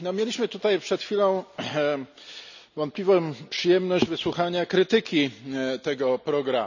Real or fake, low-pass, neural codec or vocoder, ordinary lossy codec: real; 7.2 kHz; none; none